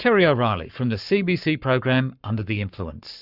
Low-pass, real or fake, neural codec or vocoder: 5.4 kHz; fake; codec, 44.1 kHz, 7.8 kbps, Pupu-Codec